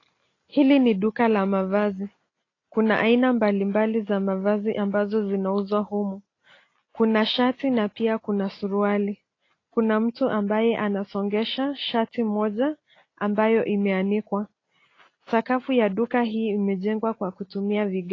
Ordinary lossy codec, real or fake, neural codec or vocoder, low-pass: AAC, 32 kbps; real; none; 7.2 kHz